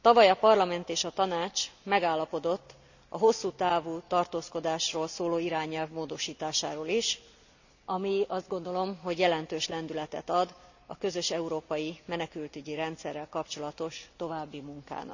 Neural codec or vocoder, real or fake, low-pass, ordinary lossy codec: none; real; 7.2 kHz; none